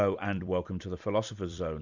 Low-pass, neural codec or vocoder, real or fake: 7.2 kHz; vocoder, 44.1 kHz, 128 mel bands every 256 samples, BigVGAN v2; fake